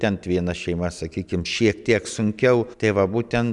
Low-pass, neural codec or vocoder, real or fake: 10.8 kHz; none; real